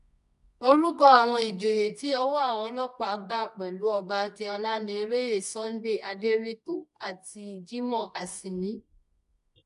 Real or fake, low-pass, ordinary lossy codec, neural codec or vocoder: fake; 10.8 kHz; none; codec, 24 kHz, 0.9 kbps, WavTokenizer, medium music audio release